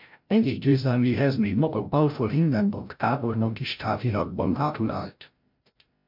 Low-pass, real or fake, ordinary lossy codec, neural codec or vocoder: 5.4 kHz; fake; MP3, 48 kbps; codec, 16 kHz, 0.5 kbps, FreqCodec, larger model